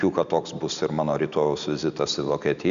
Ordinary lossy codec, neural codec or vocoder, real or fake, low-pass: MP3, 96 kbps; none; real; 7.2 kHz